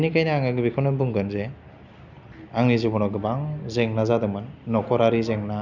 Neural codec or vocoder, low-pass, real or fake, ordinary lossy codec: none; 7.2 kHz; real; none